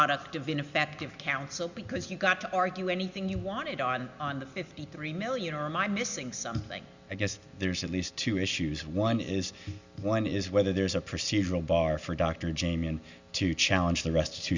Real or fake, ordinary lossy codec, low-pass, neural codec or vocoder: real; Opus, 64 kbps; 7.2 kHz; none